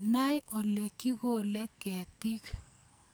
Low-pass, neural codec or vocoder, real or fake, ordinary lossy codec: none; codec, 44.1 kHz, 2.6 kbps, SNAC; fake; none